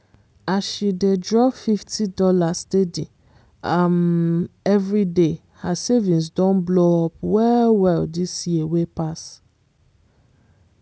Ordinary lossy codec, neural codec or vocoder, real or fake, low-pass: none; none; real; none